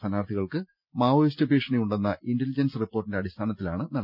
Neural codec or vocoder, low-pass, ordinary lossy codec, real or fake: none; 5.4 kHz; none; real